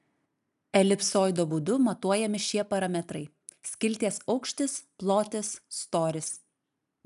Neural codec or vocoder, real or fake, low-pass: none; real; 14.4 kHz